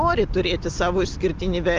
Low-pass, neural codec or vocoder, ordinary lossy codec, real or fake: 7.2 kHz; none; Opus, 32 kbps; real